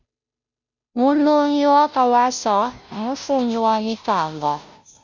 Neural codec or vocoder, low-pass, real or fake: codec, 16 kHz, 0.5 kbps, FunCodec, trained on Chinese and English, 25 frames a second; 7.2 kHz; fake